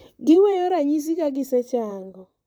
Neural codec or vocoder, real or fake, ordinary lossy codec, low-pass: vocoder, 44.1 kHz, 128 mel bands, Pupu-Vocoder; fake; none; none